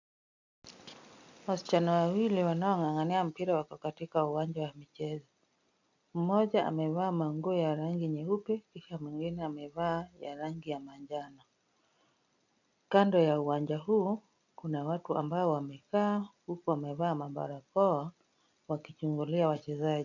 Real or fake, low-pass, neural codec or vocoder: real; 7.2 kHz; none